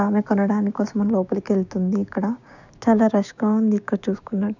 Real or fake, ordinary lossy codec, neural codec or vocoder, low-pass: fake; none; codec, 16 kHz, 6 kbps, DAC; 7.2 kHz